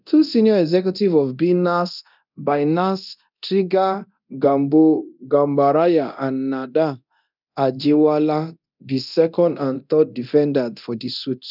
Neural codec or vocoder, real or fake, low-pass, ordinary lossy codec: codec, 24 kHz, 0.9 kbps, DualCodec; fake; 5.4 kHz; none